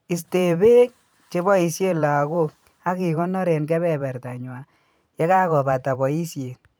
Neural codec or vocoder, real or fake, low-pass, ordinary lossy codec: vocoder, 44.1 kHz, 128 mel bands every 512 samples, BigVGAN v2; fake; none; none